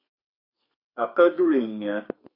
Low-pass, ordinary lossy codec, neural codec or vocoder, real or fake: 5.4 kHz; MP3, 32 kbps; codec, 32 kHz, 1.9 kbps, SNAC; fake